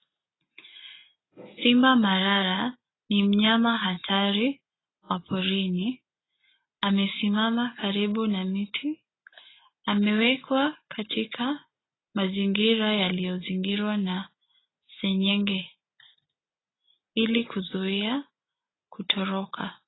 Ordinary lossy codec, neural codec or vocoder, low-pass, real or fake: AAC, 16 kbps; none; 7.2 kHz; real